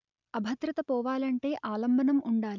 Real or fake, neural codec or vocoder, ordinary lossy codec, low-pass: real; none; none; 7.2 kHz